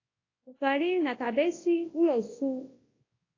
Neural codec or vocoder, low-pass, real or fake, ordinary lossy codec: codec, 24 kHz, 0.9 kbps, WavTokenizer, large speech release; 7.2 kHz; fake; AAC, 32 kbps